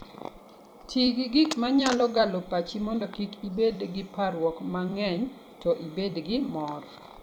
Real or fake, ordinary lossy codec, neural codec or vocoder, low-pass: fake; none; vocoder, 44.1 kHz, 128 mel bands every 256 samples, BigVGAN v2; 19.8 kHz